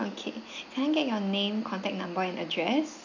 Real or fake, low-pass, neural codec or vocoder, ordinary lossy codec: real; 7.2 kHz; none; none